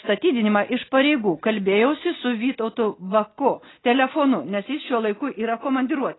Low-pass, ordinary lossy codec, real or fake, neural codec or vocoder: 7.2 kHz; AAC, 16 kbps; real; none